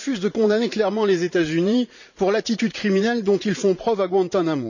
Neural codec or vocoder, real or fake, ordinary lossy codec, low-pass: vocoder, 44.1 kHz, 80 mel bands, Vocos; fake; none; 7.2 kHz